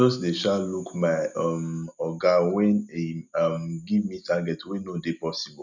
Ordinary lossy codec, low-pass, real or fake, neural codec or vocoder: none; 7.2 kHz; real; none